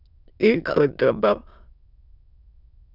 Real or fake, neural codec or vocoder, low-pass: fake; autoencoder, 22.05 kHz, a latent of 192 numbers a frame, VITS, trained on many speakers; 5.4 kHz